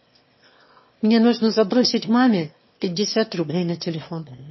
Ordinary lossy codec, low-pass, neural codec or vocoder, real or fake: MP3, 24 kbps; 7.2 kHz; autoencoder, 22.05 kHz, a latent of 192 numbers a frame, VITS, trained on one speaker; fake